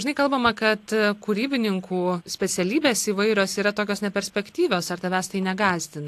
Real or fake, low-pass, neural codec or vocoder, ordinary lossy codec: real; 14.4 kHz; none; AAC, 64 kbps